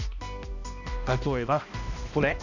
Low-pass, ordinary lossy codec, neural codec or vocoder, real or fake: 7.2 kHz; none; codec, 16 kHz, 1 kbps, X-Codec, HuBERT features, trained on balanced general audio; fake